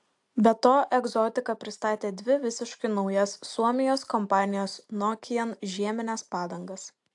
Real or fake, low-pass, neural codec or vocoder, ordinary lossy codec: real; 10.8 kHz; none; AAC, 64 kbps